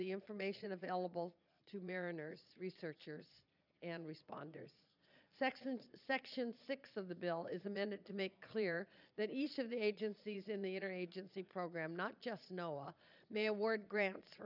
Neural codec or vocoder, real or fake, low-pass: vocoder, 22.05 kHz, 80 mel bands, Vocos; fake; 5.4 kHz